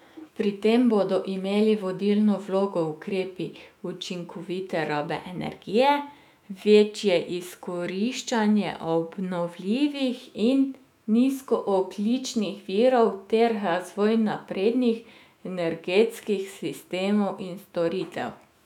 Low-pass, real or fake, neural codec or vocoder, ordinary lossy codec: 19.8 kHz; fake; autoencoder, 48 kHz, 128 numbers a frame, DAC-VAE, trained on Japanese speech; none